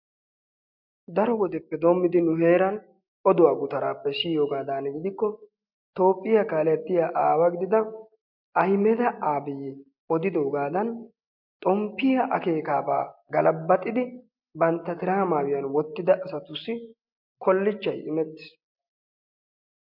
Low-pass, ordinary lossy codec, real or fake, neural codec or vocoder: 5.4 kHz; MP3, 48 kbps; real; none